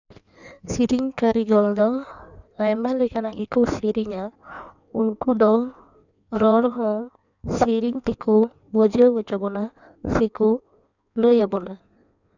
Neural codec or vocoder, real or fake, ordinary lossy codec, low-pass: codec, 16 kHz in and 24 kHz out, 1.1 kbps, FireRedTTS-2 codec; fake; none; 7.2 kHz